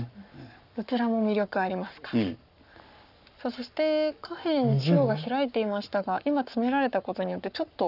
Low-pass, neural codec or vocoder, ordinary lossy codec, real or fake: 5.4 kHz; codec, 44.1 kHz, 7.8 kbps, Pupu-Codec; none; fake